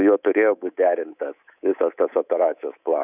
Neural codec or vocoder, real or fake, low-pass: codec, 24 kHz, 3.1 kbps, DualCodec; fake; 3.6 kHz